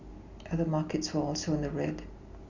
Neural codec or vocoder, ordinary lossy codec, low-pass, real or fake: none; Opus, 64 kbps; 7.2 kHz; real